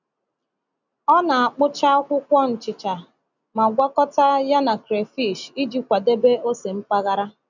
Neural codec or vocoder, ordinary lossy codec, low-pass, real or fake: none; none; 7.2 kHz; real